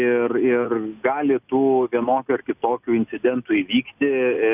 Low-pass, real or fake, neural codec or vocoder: 3.6 kHz; real; none